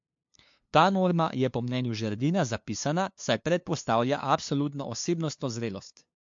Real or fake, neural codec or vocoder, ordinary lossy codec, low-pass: fake; codec, 16 kHz, 2 kbps, FunCodec, trained on LibriTTS, 25 frames a second; MP3, 48 kbps; 7.2 kHz